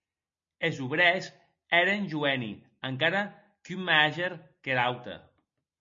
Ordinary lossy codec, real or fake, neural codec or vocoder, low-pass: MP3, 32 kbps; real; none; 7.2 kHz